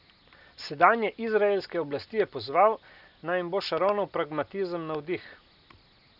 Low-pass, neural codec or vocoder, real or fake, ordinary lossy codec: 5.4 kHz; none; real; none